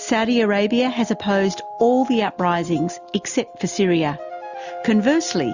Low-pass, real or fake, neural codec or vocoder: 7.2 kHz; real; none